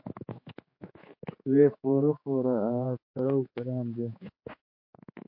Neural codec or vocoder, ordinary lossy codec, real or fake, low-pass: codec, 16 kHz, 4 kbps, X-Codec, HuBERT features, trained on general audio; MP3, 32 kbps; fake; 5.4 kHz